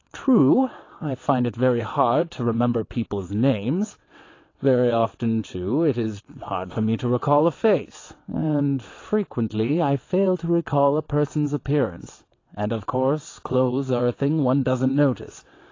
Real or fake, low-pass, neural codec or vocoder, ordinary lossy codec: fake; 7.2 kHz; vocoder, 22.05 kHz, 80 mel bands, WaveNeXt; AAC, 32 kbps